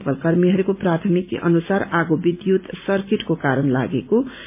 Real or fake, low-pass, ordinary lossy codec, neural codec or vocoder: real; 3.6 kHz; MP3, 32 kbps; none